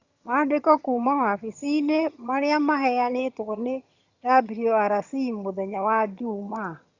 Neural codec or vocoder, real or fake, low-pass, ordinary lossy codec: vocoder, 22.05 kHz, 80 mel bands, HiFi-GAN; fake; 7.2 kHz; Opus, 64 kbps